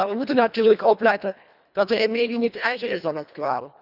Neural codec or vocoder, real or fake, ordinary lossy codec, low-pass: codec, 24 kHz, 1.5 kbps, HILCodec; fake; none; 5.4 kHz